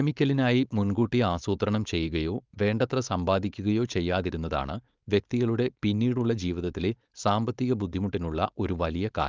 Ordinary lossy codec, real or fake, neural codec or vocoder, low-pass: Opus, 24 kbps; fake; codec, 16 kHz, 4.8 kbps, FACodec; 7.2 kHz